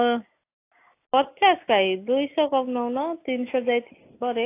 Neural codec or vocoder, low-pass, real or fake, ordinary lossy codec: none; 3.6 kHz; real; AAC, 24 kbps